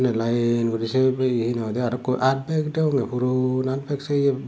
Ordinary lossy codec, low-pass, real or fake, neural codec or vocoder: none; none; real; none